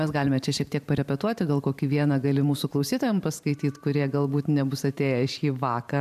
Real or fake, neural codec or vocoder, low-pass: fake; vocoder, 44.1 kHz, 128 mel bands every 512 samples, BigVGAN v2; 14.4 kHz